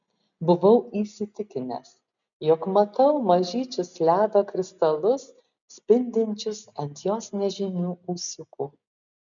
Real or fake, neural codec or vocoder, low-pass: real; none; 7.2 kHz